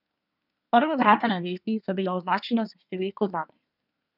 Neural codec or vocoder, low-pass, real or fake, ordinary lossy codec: codec, 24 kHz, 1 kbps, SNAC; 5.4 kHz; fake; none